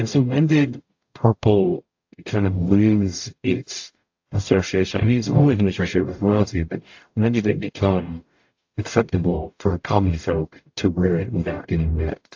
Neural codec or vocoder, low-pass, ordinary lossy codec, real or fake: codec, 44.1 kHz, 0.9 kbps, DAC; 7.2 kHz; AAC, 48 kbps; fake